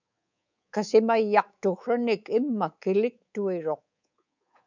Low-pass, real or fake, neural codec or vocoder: 7.2 kHz; fake; codec, 24 kHz, 3.1 kbps, DualCodec